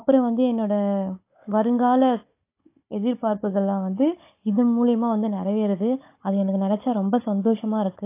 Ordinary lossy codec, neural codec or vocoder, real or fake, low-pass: AAC, 24 kbps; codec, 16 kHz, 16 kbps, FunCodec, trained on Chinese and English, 50 frames a second; fake; 3.6 kHz